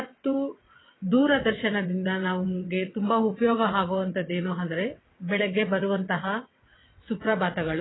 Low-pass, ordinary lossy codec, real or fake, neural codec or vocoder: 7.2 kHz; AAC, 16 kbps; fake; vocoder, 22.05 kHz, 80 mel bands, WaveNeXt